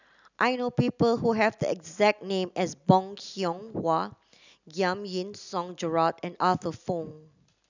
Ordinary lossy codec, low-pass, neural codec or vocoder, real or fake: none; 7.2 kHz; none; real